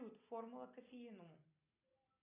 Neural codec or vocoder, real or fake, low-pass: none; real; 3.6 kHz